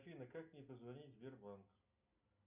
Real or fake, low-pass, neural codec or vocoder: real; 3.6 kHz; none